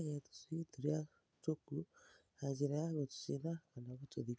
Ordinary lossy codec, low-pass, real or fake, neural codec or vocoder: none; none; real; none